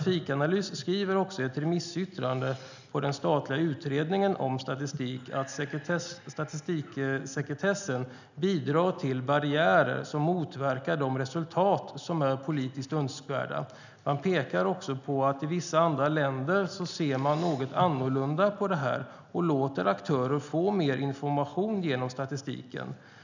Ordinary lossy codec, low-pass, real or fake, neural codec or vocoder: none; 7.2 kHz; real; none